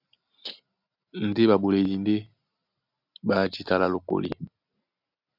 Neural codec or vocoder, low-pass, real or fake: none; 5.4 kHz; real